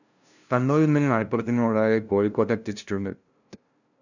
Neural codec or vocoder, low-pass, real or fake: codec, 16 kHz, 0.5 kbps, FunCodec, trained on LibriTTS, 25 frames a second; 7.2 kHz; fake